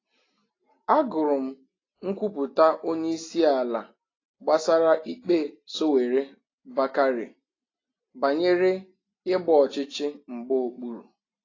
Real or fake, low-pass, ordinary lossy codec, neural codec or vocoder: real; 7.2 kHz; AAC, 32 kbps; none